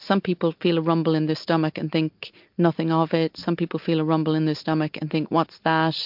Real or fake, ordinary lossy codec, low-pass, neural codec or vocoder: real; MP3, 48 kbps; 5.4 kHz; none